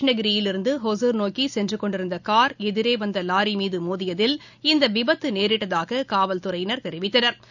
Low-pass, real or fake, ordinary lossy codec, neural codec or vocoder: 7.2 kHz; real; none; none